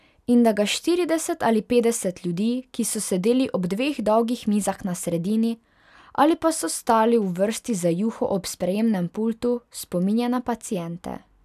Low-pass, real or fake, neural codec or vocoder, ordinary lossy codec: 14.4 kHz; real; none; none